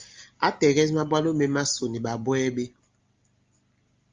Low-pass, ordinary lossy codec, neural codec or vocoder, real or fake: 7.2 kHz; Opus, 32 kbps; none; real